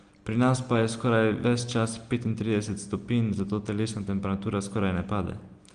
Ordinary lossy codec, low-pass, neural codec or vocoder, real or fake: Opus, 24 kbps; 9.9 kHz; none; real